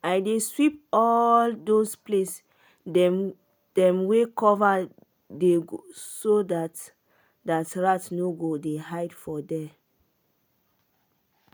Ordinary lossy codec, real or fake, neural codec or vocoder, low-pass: none; real; none; none